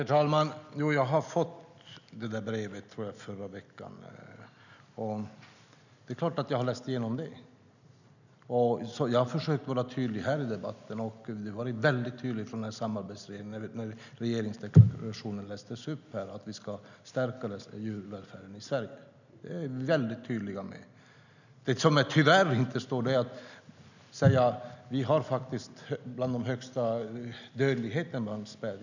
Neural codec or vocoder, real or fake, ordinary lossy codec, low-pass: none; real; none; 7.2 kHz